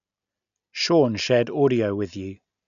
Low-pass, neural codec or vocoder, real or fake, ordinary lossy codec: 7.2 kHz; none; real; none